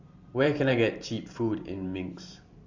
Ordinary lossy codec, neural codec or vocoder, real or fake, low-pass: Opus, 64 kbps; vocoder, 44.1 kHz, 128 mel bands every 512 samples, BigVGAN v2; fake; 7.2 kHz